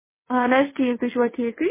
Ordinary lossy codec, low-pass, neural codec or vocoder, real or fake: MP3, 16 kbps; 3.6 kHz; vocoder, 22.05 kHz, 80 mel bands, WaveNeXt; fake